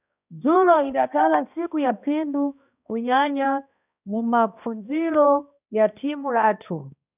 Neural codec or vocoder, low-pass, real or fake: codec, 16 kHz, 1 kbps, X-Codec, HuBERT features, trained on balanced general audio; 3.6 kHz; fake